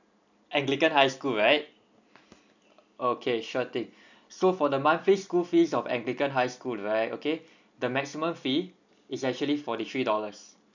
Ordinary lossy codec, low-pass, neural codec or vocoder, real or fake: none; 7.2 kHz; none; real